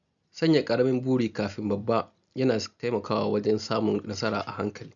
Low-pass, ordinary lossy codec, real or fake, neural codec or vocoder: 7.2 kHz; none; real; none